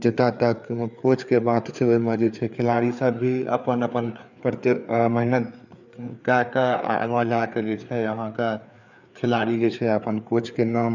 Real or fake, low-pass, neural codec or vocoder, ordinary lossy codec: fake; 7.2 kHz; codec, 16 kHz, 4 kbps, FreqCodec, larger model; none